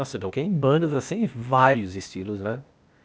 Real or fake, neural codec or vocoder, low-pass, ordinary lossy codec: fake; codec, 16 kHz, 0.8 kbps, ZipCodec; none; none